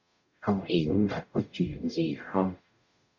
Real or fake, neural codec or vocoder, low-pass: fake; codec, 44.1 kHz, 0.9 kbps, DAC; 7.2 kHz